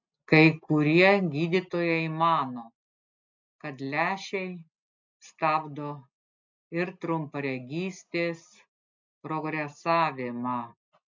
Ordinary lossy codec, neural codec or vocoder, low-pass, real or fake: MP3, 64 kbps; none; 7.2 kHz; real